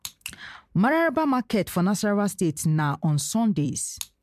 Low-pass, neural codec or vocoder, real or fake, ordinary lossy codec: 14.4 kHz; vocoder, 44.1 kHz, 128 mel bands every 256 samples, BigVGAN v2; fake; MP3, 96 kbps